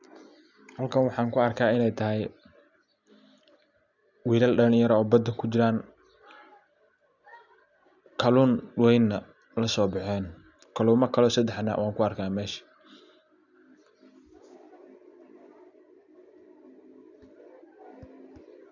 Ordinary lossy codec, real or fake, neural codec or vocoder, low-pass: none; real; none; 7.2 kHz